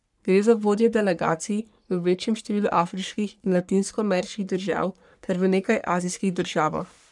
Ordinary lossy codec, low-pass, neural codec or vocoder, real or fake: none; 10.8 kHz; codec, 44.1 kHz, 3.4 kbps, Pupu-Codec; fake